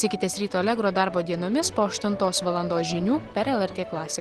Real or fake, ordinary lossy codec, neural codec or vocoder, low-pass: real; Opus, 16 kbps; none; 10.8 kHz